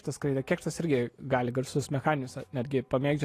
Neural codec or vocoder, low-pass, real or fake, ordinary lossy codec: none; 14.4 kHz; real; AAC, 48 kbps